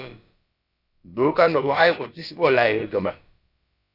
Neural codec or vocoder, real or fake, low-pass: codec, 16 kHz, about 1 kbps, DyCAST, with the encoder's durations; fake; 5.4 kHz